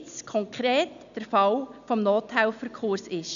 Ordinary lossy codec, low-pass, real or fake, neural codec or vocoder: none; 7.2 kHz; real; none